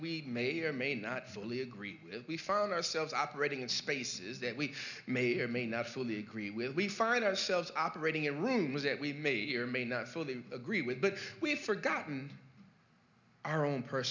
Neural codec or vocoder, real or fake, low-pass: none; real; 7.2 kHz